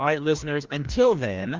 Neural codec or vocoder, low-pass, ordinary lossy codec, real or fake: codec, 16 kHz, 1 kbps, FreqCodec, larger model; 7.2 kHz; Opus, 32 kbps; fake